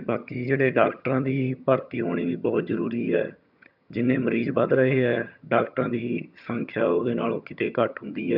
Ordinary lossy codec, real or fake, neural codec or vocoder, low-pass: none; fake; vocoder, 22.05 kHz, 80 mel bands, HiFi-GAN; 5.4 kHz